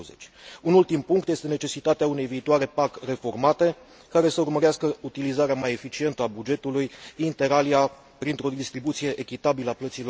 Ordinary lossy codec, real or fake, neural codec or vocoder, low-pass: none; real; none; none